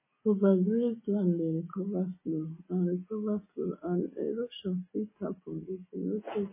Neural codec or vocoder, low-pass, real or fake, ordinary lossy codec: none; 3.6 kHz; real; MP3, 16 kbps